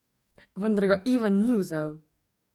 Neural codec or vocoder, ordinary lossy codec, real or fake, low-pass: codec, 44.1 kHz, 2.6 kbps, DAC; none; fake; 19.8 kHz